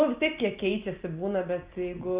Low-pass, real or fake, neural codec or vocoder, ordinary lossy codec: 3.6 kHz; real; none; Opus, 24 kbps